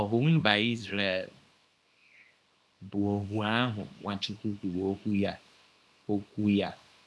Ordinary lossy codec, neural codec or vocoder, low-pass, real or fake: none; codec, 24 kHz, 0.9 kbps, WavTokenizer, small release; none; fake